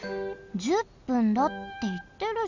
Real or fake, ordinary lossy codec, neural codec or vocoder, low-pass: real; none; none; 7.2 kHz